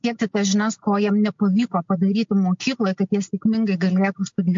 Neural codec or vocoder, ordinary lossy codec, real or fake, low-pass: none; MP3, 48 kbps; real; 7.2 kHz